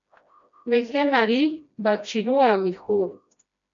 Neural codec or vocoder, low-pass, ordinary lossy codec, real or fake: codec, 16 kHz, 1 kbps, FreqCodec, smaller model; 7.2 kHz; MP3, 64 kbps; fake